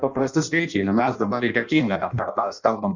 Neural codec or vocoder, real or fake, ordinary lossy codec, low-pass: codec, 16 kHz in and 24 kHz out, 0.6 kbps, FireRedTTS-2 codec; fake; Opus, 64 kbps; 7.2 kHz